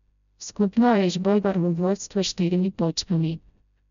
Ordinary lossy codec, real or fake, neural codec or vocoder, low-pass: none; fake; codec, 16 kHz, 0.5 kbps, FreqCodec, smaller model; 7.2 kHz